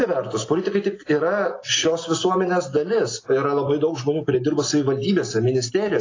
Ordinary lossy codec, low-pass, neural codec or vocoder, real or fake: AAC, 32 kbps; 7.2 kHz; none; real